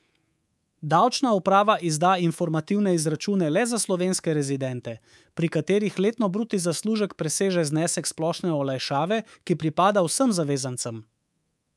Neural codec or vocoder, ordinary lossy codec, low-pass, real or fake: codec, 24 kHz, 3.1 kbps, DualCodec; none; none; fake